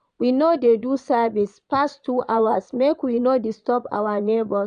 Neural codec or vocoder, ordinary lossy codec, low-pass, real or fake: vocoder, 22.05 kHz, 80 mel bands, Vocos; none; 9.9 kHz; fake